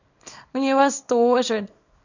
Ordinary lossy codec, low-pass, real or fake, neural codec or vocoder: none; 7.2 kHz; fake; codec, 24 kHz, 0.9 kbps, WavTokenizer, small release